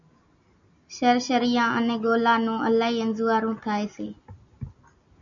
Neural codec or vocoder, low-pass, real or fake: none; 7.2 kHz; real